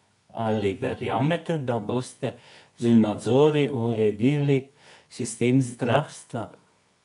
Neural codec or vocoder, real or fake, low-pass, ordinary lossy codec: codec, 24 kHz, 0.9 kbps, WavTokenizer, medium music audio release; fake; 10.8 kHz; none